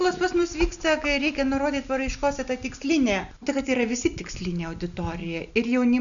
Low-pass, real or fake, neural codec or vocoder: 7.2 kHz; real; none